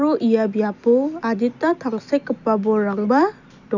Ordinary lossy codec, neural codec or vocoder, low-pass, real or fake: none; none; 7.2 kHz; real